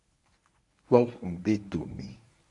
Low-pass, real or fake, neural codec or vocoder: 10.8 kHz; fake; codec, 24 kHz, 0.9 kbps, WavTokenizer, medium speech release version 1